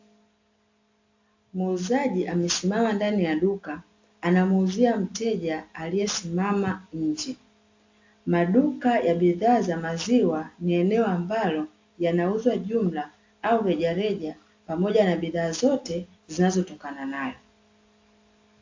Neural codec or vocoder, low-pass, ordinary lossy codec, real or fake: none; 7.2 kHz; AAC, 48 kbps; real